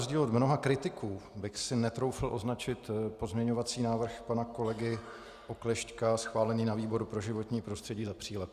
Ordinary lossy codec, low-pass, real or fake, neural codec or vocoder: Opus, 64 kbps; 14.4 kHz; real; none